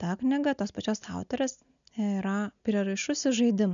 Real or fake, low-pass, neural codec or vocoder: real; 7.2 kHz; none